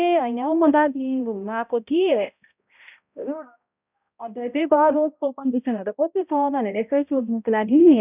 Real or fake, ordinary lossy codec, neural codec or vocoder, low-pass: fake; none; codec, 16 kHz, 0.5 kbps, X-Codec, HuBERT features, trained on balanced general audio; 3.6 kHz